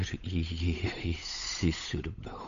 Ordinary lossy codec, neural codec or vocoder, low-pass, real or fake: AAC, 96 kbps; codec, 16 kHz, 8 kbps, FunCodec, trained on Chinese and English, 25 frames a second; 7.2 kHz; fake